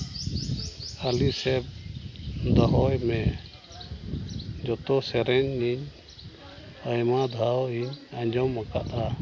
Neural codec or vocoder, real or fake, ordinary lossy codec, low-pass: none; real; none; none